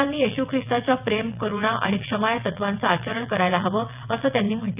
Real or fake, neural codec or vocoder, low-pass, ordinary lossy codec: fake; vocoder, 22.05 kHz, 80 mel bands, WaveNeXt; 3.6 kHz; none